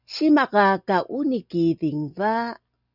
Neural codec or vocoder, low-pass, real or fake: none; 5.4 kHz; real